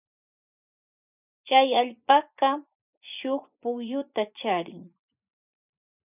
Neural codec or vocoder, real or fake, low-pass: vocoder, 24 kHz, 100 mel bands, Vocos; fake; 3.6 kHz